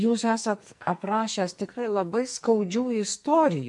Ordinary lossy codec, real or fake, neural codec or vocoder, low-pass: MP3, 64 kbps; fake; codec, 44.1 kHz, 2.6 kbps, SNAC; 10.8 kHz